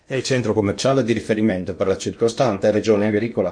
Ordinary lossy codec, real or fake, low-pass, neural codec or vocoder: MP3, 48 kbps; fake; 9.9 kHz; codec, 16 kHz in and 24 kHz out, 0.8 kbps, FocalCodec, streaming, 65536 codes